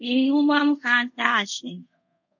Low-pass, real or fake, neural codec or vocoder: 7.2 kHz; fake; codec, 24 kHz, 0.5 kbps, DualCodec